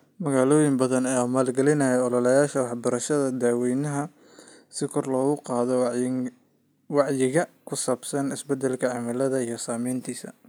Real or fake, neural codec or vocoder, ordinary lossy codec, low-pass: real; none; none; none